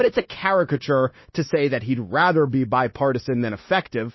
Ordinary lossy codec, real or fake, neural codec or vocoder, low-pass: MP3, 24 kbps; fake; codec, 16 kHz, 0.9 kbps, LongCat-Audio-Codec; 7.2 kHz